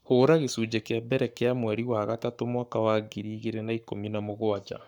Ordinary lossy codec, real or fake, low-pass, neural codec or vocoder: none; fake; 19.8 kHz; codec, 44.1 kHz, 7.8 kbps, Pupu-Codec